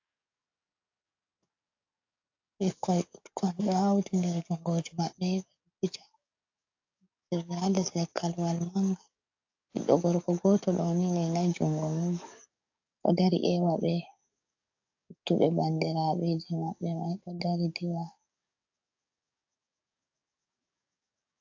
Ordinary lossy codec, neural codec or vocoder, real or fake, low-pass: AAC, 48 kbps; codec, 44.1 kHz, 7.8 kbps, DAC; fake; 7.2 kHz